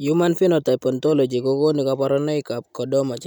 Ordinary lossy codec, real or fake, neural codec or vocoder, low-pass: none; real; none; 19.8 kHz